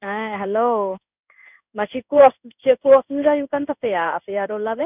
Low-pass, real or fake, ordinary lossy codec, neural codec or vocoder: 3.6 kHz; fake; none; codec, 16 kHz in and 24 kHz out, 1 kbps, XY-Tokenizer